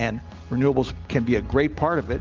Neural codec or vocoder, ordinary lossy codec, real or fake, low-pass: none; Opus, 24 kbps; real; 7.2 kHz